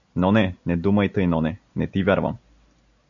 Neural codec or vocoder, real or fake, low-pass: none; real; 7.2 kHz